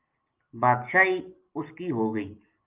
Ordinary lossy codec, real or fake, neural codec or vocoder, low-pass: Opus, 24 kbps; real; none; 3.6 kHz